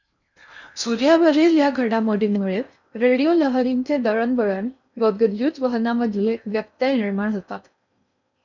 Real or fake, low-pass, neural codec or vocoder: fake; 7.2 kHz; codec, 16 kHz in and 24 kHz out, 0.6 kbps, FocalCodec, streaming, 4096 codes